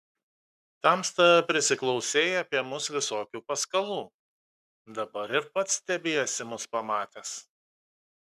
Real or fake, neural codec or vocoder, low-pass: fake; codec, 44.1 kHz, 7.8 kbps, Pupu-Codec; 14.4 kHz